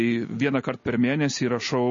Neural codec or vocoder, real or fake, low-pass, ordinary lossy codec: none; real; 7.2 kHz; MP3, 32 kbps